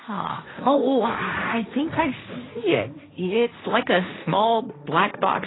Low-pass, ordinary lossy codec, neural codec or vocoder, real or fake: 7.2 kHz; AAC, 16 kbps; codec, 16 kHz, 1.1 kbps, Voila-Tokenizer; fake